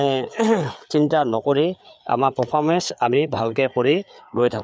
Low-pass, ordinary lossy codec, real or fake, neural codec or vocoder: none; none; fake; codec, 16 kHz, 4 kbps, FreqCodec, larger model